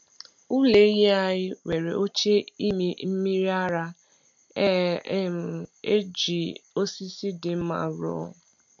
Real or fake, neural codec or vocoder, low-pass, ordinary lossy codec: real; none; 7.2 kHz; MP3, 64 kbps